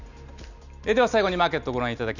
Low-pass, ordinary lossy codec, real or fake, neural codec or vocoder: 7.2 kHz; none; real; none